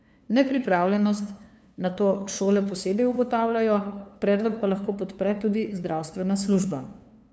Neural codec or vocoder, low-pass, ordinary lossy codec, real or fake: codec, 16 kHz, 2 kbps, FunCodec, trained on LibriTTS, 25 frames a second; none; none; fake